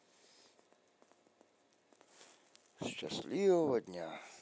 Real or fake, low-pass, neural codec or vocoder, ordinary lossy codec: real; none; none; none